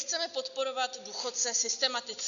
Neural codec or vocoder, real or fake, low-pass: none; real; 7.2 kHz